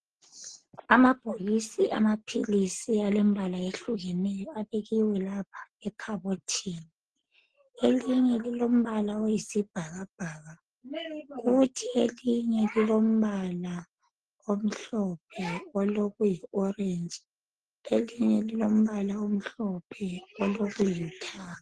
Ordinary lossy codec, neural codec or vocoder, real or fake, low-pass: Opus, 16 kbps; none; real; 10.8 kHz